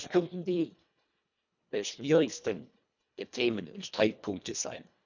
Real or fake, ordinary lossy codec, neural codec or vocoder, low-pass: fake; none; codec, 24 kHz, 1.5 kbps, HILCodec; 7.2 kHz